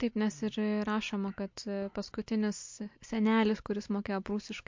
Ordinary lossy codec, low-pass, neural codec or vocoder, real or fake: MP3, 48 kbps; 7.2 kHz; none; real